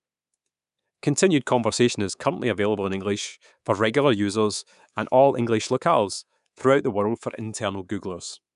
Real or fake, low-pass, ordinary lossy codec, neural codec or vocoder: fake; 10.8 kHz; none; codec, 24 kHz, 3.1 kbps, DualCodec